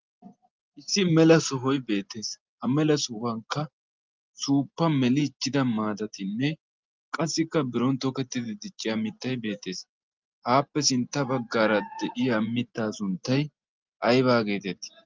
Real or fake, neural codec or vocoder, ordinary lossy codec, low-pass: real; none; Opus, 32 kbps; 7.2 kHz